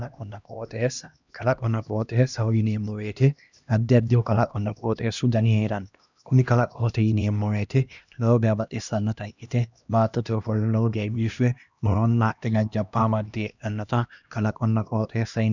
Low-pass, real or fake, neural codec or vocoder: 7.2 kHz; fake; codec, 16 kHz, 1 kbps, X-Codec, HuBERT features, trained on LibriSpeech